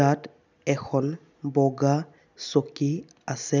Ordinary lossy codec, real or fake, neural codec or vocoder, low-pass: none; real; none; 7.2 kHz